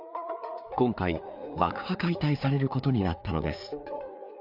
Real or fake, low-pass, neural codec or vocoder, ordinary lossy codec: fake; 5.4 kHz; codec, 16 kHz, 4 kbps, FreqCodec, larger model; none